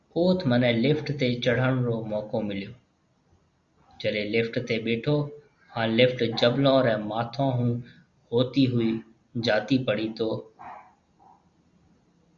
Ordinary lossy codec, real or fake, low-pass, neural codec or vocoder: Opus, 64 kbps; real; 7.2 kHz; none